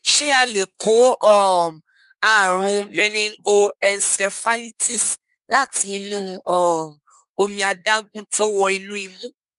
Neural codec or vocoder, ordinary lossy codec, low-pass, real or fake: codec, 24 kHz, 1 kbps, SNAC; MP3, 96 kbps; 10.8 kHz; fake